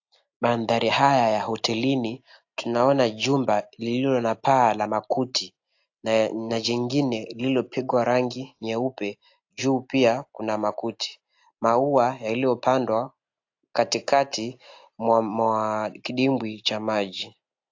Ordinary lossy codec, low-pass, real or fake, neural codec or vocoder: AAC, 48 kbps; 7.2 kHz; real; none